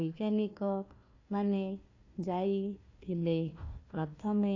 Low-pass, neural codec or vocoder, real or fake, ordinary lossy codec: 7.2 kHz; codec, 16 kHz, 1 kbps, FunCodec, trained on Chinese and English, 50 frames a second; fake; AAC, 48 kbps